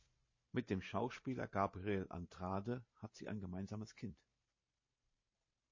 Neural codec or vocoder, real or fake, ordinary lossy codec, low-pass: vocoder, 44.1 kHz, 128 mel bands every 512 samples, BigVGAN v2; fake; MP3, 32 kbps; 7.2 kHz